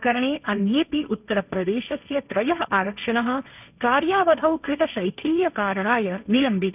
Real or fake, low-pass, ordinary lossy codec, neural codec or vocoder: fake; 3.6 kHz; none; codec, 16 kHz, 1.1 kbps, Voila-Tokenizer